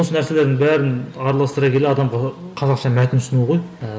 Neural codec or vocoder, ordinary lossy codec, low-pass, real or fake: none; none; none; real